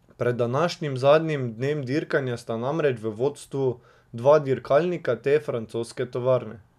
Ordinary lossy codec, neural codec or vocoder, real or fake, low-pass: none; none; real; 14.4 kHz